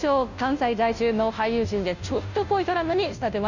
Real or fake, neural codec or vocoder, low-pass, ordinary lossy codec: fake; codec, 16 kHz, 0.5 kbps, FunCodec, trained on Chinese and English, 25 frames a second; 7.2 kHz; none